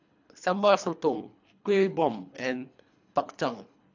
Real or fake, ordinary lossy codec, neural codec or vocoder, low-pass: fake; AAC, 48 kbps; codec, 24 kHz, 3 kbps, HILCodec; 7.2 kHz